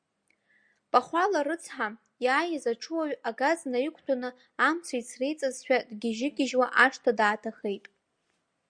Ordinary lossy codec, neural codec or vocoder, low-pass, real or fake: Opus, 64 kbps; none; 9.9 kHz; real